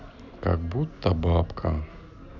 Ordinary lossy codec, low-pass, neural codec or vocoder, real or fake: none; 7.2 kHz; none; real